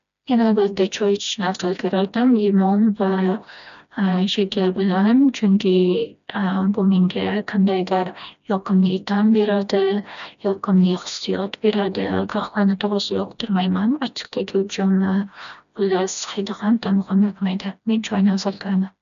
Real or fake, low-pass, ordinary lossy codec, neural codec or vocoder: fake; 7.2 kHz; none; codec, 16 kHz, 1 kbps, FreqCodec, smaller model